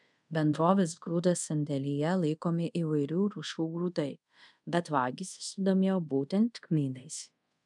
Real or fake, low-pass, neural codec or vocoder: fake; 10.8 kHz; codec, 24 kHz, 0.5 kbps, DualCodec